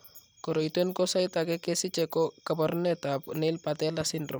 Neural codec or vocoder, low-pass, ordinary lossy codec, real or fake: none; none; none; real